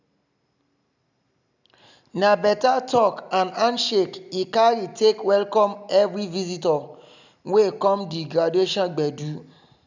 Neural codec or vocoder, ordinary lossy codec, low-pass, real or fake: none; none; 7.2 kHz; real